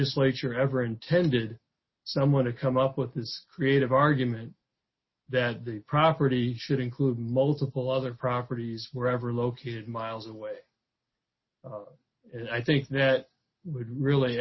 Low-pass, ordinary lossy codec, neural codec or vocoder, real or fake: 7.2 kHz; MP3, 24 kbps; none; real